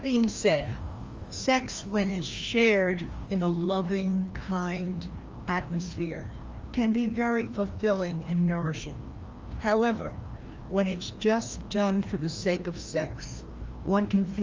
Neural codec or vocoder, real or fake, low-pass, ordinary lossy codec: codec, 16 kHz, 1 kbps, FreqCodec, larger model; fake; 7.2 kHz; Opus, 32 kbps